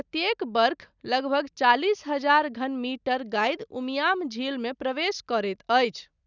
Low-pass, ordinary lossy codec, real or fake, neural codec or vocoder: 7.2 kHz; none; real; none